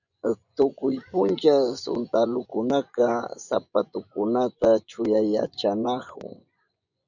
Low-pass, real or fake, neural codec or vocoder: 7.2 kHz; fake; vocoder, 24 kHz, 100 mel bands, Vocos